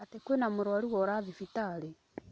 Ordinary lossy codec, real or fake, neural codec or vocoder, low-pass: none; real; none; none